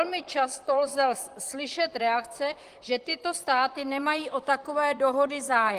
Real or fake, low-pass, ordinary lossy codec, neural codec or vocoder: fake; 14.4 kHz; Opus, 24 kbps; vocoder, 44.1 kHz, 128 mel bands, Pupu-Vocoder